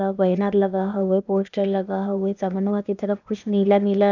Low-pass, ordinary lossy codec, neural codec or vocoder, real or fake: 7.2 kHz; none; codec, 16 kHz, 0.8 kbps, ZipCodec; fake